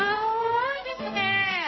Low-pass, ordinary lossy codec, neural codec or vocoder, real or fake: 7.2 kHz; MP3, 24 kbps; codec, 16 kHz, 0.5 kbps, X-Codec, HuBERT features, trained on general audio; fake